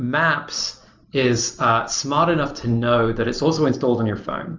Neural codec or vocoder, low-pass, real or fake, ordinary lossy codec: none; 7.2 kHz; real; Opus, 32 kbps